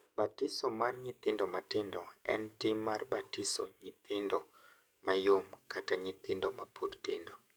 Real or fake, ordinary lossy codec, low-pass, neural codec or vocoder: fake; none; none; codec, 44.1 kHz, 7.8 kbps, DAC